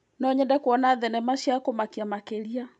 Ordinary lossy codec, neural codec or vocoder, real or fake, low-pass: none; none; real; 10.8 kHz